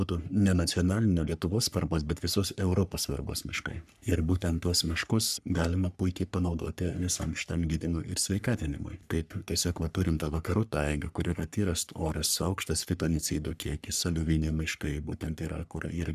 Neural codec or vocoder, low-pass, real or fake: codec, 44.1 kHz, 3.4 kbps, Pupu-Codec; 14.4 kHz; fake